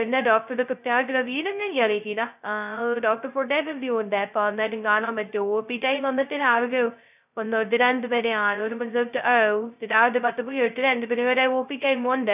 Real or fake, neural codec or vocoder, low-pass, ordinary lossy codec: fake; codec, 16 kHz, 0.2 kbps, FocalCodec; 3.6 kHz; none